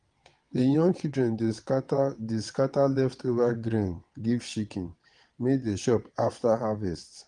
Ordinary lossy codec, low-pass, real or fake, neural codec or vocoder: Opus, 24 kbps; 9.9 kHz; fake; vocoder, 22.05 kHz, 80 mel bands, WaveNeXt